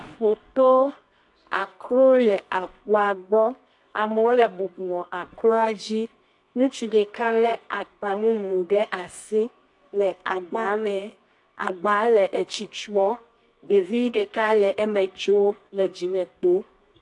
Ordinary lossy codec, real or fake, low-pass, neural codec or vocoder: AAC, 64 kbps; fake; 10.8 kHz; codec, 24 kHz, 0.9 kbps, WavTokenizer, medium music audio release